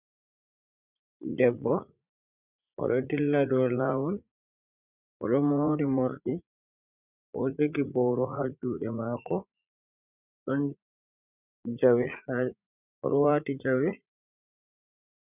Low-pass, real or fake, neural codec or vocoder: 3.6 kHz; fake; vocoder, 44.1 kHz, 80 mel bands, Vocos